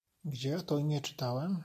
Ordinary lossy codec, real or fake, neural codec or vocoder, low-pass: MP3, 64 kbps; real; none; 14.4 kHz